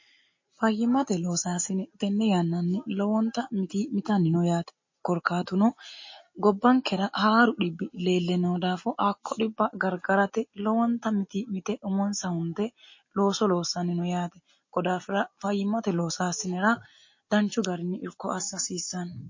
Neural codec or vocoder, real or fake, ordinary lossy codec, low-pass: none; real; MP3, 32 kbps; 7.2 kHz